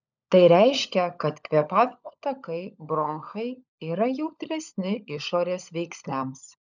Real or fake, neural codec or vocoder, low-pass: fake; codec, 16 kHz, 16 kbps, FunCodec, trained on LibriTTS, 50 frames a second; 7.2 kHz